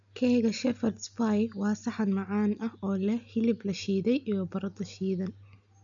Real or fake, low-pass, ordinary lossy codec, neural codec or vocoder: real; 7.2 kHz; none; none